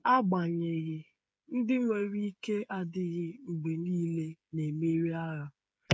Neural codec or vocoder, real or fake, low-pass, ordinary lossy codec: codec, 16 kHz, 8 kbps, FreqCodec, smaller model; fake; none; none